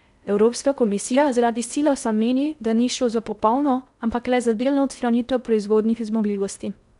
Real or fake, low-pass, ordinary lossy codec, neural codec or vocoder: fake; 10.8 kHz; none; codec, 16 kHz in and 24 kHz out, 0.6 kbps, FocalCodec, streaming, 4096 codes